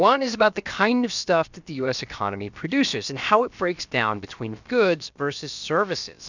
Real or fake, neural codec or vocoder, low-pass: fake; codec, 16 kHz, about 1 kbps, DyCAST, with the encoder's durations; 7.2 kHz